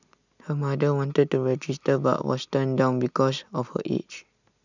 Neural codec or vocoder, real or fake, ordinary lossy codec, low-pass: none; real; none; 7.2 kHz